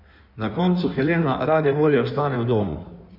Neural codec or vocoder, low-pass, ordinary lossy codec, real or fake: codec, 16 kHz in and 24 kHz out, 1.1 kbps, FireRedTTS-2 codec; 5.4 kHz; none; fake